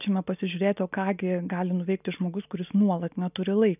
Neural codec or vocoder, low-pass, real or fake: none; 3.6 kHz; real